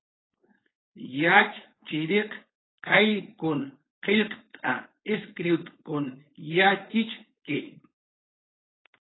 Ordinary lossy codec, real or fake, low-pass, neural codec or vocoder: AAC, 16 kbps; fake; 7.2 kHz; codec, 16 kHz, 4.8 kbps, FACodec